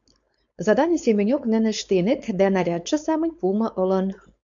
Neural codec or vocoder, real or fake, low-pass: codec, 16 kHz, 4.8 kbps, FACodec; fake; 7.2 kHz